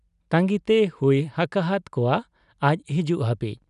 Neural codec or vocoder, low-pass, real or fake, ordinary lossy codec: none; 10.8 kHz; real; none